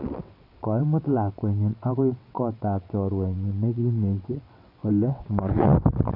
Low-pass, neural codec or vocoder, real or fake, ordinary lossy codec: 5.4 kHz; vocoder, 22.05 kHz, 80 mel bands, WaveNeXt; fake; none